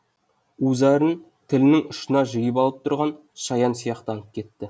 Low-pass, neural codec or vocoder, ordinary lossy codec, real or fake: none; none; none; real